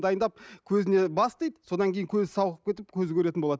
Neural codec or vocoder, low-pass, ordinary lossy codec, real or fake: none; none; none; real